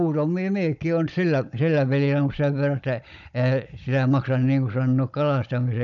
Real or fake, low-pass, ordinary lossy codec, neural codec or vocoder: fake; 7.2 kHz; none; codec, 16 kHz, 16 kbps, FunCodec, trained on Chinese and English, 50 frames a second